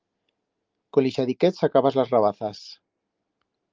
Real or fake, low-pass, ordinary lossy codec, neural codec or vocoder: real; 7.2 kHz; Opus, 32 kbps; none